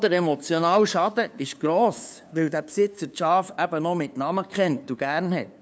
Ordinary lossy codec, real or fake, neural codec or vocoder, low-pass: none; fake; codec, 16 kHz, 2 kbps, FunCodec, trained on LibriTTS, 25 frames a second; none